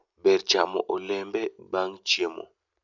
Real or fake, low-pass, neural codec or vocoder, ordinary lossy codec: real; 7.2 kHz; none; none